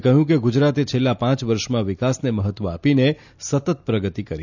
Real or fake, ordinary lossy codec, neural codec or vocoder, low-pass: real; none; none; 7.2 kHz